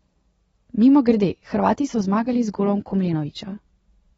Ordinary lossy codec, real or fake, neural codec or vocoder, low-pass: AAC, 24 kbps; real; none; 19.8 kHz